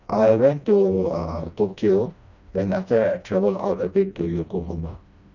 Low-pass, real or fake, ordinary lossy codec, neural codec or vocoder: 7.2 kHz; fake; none; codec, 16 kHz, 1 kbps, FreqCodec, smaller model